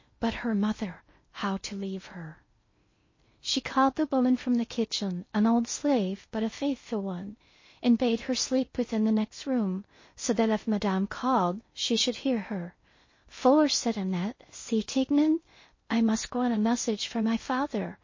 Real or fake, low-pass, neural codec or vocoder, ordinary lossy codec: fake; 7.2 kHz; codec, 16 kHz in and 24 kHz out, 0.6 kbps, FocalCodec, streaming, 2048 codes; MP3, 32 kbps